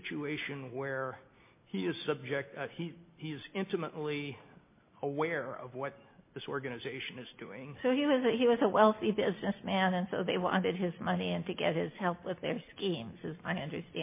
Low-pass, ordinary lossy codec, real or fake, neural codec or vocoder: 3.6 kHz; MP3, 24 kbps; real; none